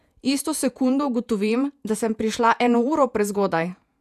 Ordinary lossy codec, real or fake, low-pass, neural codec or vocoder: none; fake; 14.4 kHz; vocoder, 48 kHz, 128 mel bands, Vocos